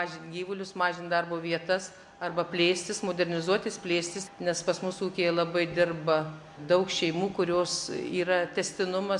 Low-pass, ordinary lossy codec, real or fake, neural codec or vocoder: 10.8 kHz; MP3, 64 kbps; real; none